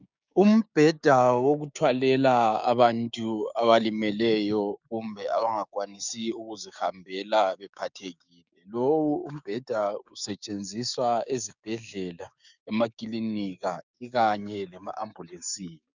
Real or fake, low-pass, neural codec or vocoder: fake; 7.2 kHz; codec, 16 kHz, 6 kbps, DAC